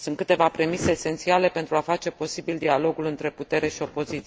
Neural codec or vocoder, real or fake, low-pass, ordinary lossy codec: none; real; none; none